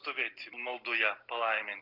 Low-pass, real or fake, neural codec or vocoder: 5.4 kHz; real; none